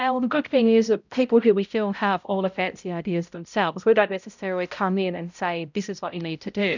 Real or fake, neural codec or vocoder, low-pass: fake; codec, 16 kHz, 0.5 kbps, X-Codec, HuBERT features, trained on balanced general audio; 7.2 kHz